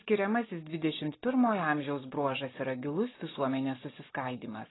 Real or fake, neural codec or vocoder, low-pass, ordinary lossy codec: real; none; 7.2 kHz; AAC, 16 kbps